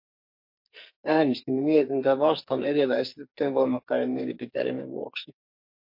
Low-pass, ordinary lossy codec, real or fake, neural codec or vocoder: 5.4 kHz; MP3, 32 kbps; fake; codec, 44.1 kHz, 2.6 kbps, SNAC